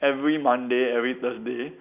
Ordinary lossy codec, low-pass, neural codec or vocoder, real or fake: none; 3.6 kHz; none; real